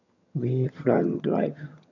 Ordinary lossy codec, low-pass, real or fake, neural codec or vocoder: none; 7.2 kHz; fake; vocoder, 22.05 kHz, 80 mel bands, HiFi-GAN